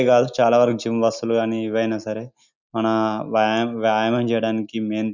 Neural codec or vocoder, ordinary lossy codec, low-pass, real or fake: none; none; 7.2 kHz; real